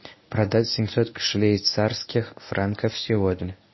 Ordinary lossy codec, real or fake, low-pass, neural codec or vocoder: MP3, 24 kbps; fake; 7.2 kHz; codec, 24 kHz, 0.9 kbps, WavTokenizer, medium speech release version 1